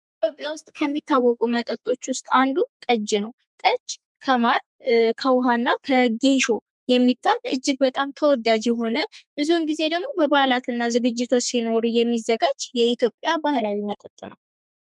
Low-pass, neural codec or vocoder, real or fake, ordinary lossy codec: 10.8 kHz; codec, 32 kHz, 1.9 kbps, SNAC; fake; MP3, 96 kbps